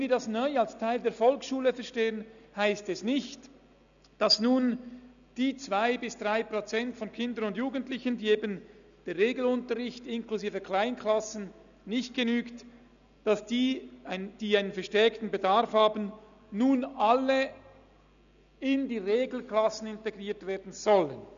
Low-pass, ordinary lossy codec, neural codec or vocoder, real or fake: 7.2 kHz; none; none; real